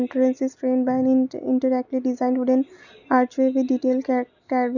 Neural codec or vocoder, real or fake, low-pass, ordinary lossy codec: none; real; 7.2 kHz; none